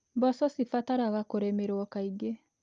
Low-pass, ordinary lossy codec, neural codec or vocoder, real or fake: 7.2 kHz; Opus, 32 kbps; none; real